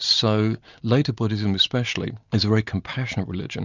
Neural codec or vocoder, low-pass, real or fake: none; 7.2 kHz; real